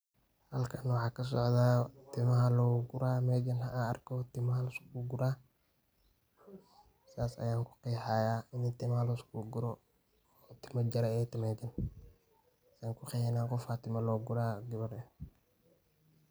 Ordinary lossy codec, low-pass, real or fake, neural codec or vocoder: none; none; real; none